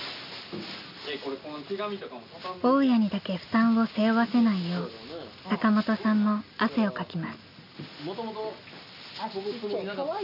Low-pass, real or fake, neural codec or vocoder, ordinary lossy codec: 5.4 kHz; real; none; none